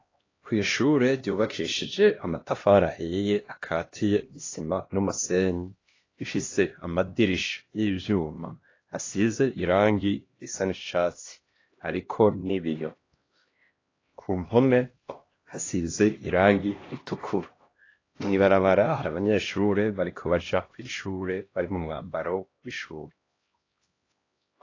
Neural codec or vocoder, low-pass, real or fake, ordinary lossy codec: codec, 16 kHz, 1 kbps, X-Codec, HuBERT features, trained on LibriSpeech; 7.2 kHz; fake; AAC, 32 kbps